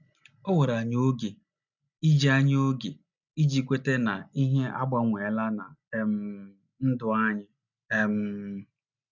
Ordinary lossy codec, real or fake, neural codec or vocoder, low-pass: none; real; none; 7.2 kHz